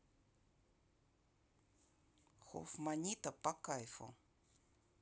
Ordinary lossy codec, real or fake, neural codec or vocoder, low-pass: none; real; none; none